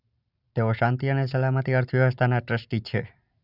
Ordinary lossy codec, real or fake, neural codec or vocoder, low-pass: none; real; none; 5.4 kHz